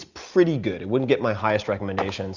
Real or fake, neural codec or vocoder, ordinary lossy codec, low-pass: real; none; Opus, 64 kbps; 7.2 kHz